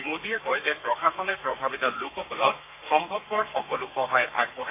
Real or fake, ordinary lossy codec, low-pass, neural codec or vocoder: fake; AAC, 24 kbps; 3.6 kHz; codec, 32 kHz, 1.9 kbps, SNAC